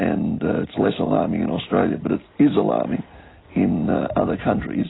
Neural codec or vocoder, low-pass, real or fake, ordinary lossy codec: none; 7.2 kHz; real; AAC, 16 kbps